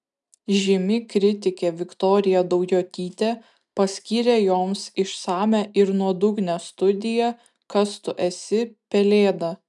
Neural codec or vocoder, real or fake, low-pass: none; real; 10.8 kHz